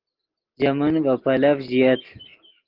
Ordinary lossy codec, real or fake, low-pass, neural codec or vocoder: Opus, 16 kbps; real; 5.4 kHz; none